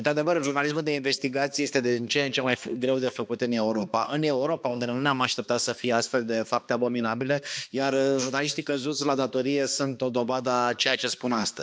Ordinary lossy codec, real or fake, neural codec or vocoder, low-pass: none; fake; codec, 16 kHz, 2 kbps, X-Codec, HuBERT features, trained on balanced general audio; none